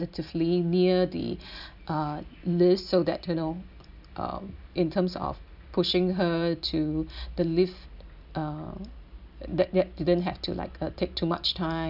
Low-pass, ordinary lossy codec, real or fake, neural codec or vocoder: 5.4 kHz; none; real; none